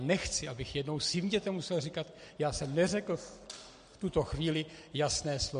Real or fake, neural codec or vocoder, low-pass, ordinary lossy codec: real; none; 9.9 kHz; MP3, 48 kbps